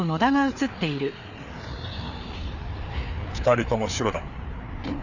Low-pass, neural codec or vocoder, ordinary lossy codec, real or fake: 7.2 kHz; codec, 16 kHz, 4 kbps, FreqCodec, larger model; none; fake